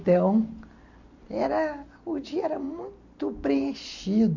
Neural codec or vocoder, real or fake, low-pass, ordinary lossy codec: none; real; 7.2 kHz; none